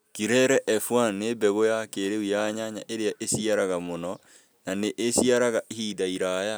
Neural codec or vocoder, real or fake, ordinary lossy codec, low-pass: none; real; none; none